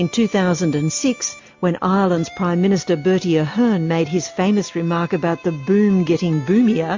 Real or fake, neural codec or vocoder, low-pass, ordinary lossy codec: real; none; 7.2 kHz; MP3, 48 kbps